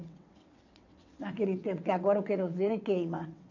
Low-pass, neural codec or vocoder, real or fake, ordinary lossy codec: 7.2 kHz; vocoder, 22.05 kHz, 80 mel bands, WaveNeXt; fake; none